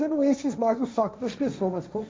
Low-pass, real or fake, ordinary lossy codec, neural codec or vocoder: none; fake; none; codec, 16 kHz, 1.1 kbps, Voila-Tokenizer